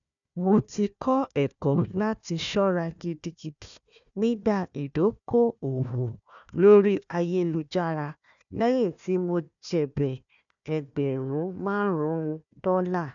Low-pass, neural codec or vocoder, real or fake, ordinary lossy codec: 7.2 kHz; codec, 16 kHz, 1 kbps, FunCodec, trained on Chinese and English, 50 frames a second; fake; none